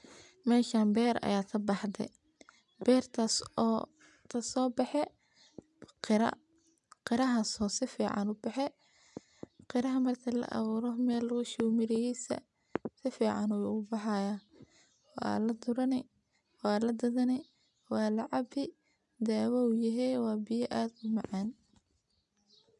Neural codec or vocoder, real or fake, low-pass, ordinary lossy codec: none; real; 10.8 kHz; none